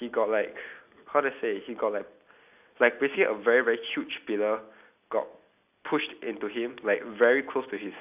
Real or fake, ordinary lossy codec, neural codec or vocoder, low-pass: real; AAC, 32 kbps; none; 3.6 kHz